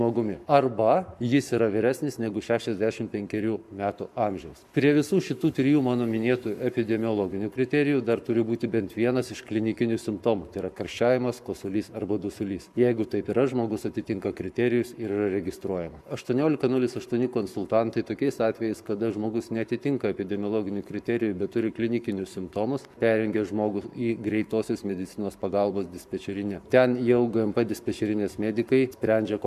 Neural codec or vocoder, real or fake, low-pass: codec, 44.1 kHz, 7.8 kbps, Pupu-Codec; fake; 14.4 kHz